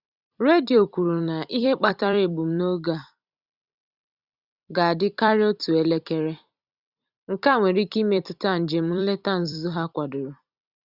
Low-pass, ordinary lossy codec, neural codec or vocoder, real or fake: 5.4 kHz; Opus, 64 kbps; vocoder, 44.1 kHz, 128 mel bands every 256 samples, BigVGAN v2; fake